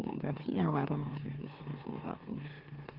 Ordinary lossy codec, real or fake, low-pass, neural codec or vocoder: Opus, 16 kbps; fake; 5.4 kHz; autoencoder, 44.1 kHz, a latent of 192 numbers a frame, MeloTTS